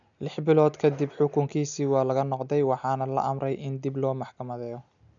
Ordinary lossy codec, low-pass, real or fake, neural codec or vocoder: none; 7.2 kHz; real; none